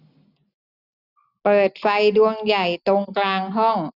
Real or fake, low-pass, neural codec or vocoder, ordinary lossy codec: real; 5.4 kHz; none; none